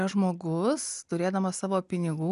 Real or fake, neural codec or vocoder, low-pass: real; none; 10.8 kHz